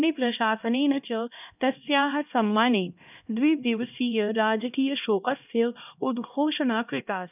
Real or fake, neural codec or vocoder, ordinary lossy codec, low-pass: fake; codec, 16 kHz, 1 kbps, X-Codec, HuBERT features, trained on LibriSpeech; none; 3.6 kHz